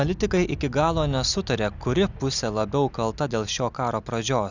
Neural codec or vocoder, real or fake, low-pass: none; real; 7.2 kHz